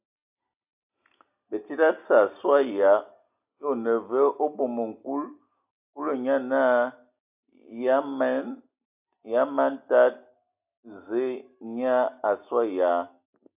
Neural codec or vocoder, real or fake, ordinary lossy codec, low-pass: none; real; AAC, 32 kbps; 3.6 kHz